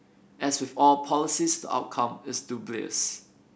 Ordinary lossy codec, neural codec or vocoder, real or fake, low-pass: none; none; real; none